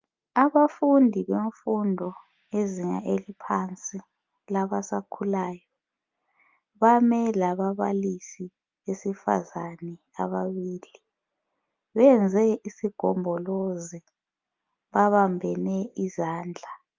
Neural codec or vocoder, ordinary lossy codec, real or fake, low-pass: none; Opus, 24 kbps; real; 7.2 kHz